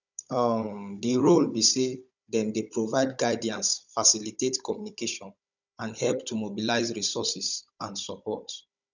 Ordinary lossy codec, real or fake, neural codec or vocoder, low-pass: none; fake; codec, 16 kHz, 16 kbps, FunCodec, trained on Chinese and English, 50 frames a second; 7.2 kHz